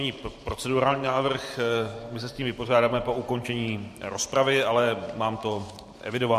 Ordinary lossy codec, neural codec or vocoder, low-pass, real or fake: MP3, 64 kbps; vocoder, 44.1 kHz, 128 mel bands every 512 samples, BigVGAN v2; 14.4 kHz; fake